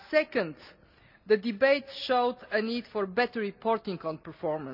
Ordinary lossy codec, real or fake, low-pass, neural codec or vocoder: none; real; 5.4 kHz; none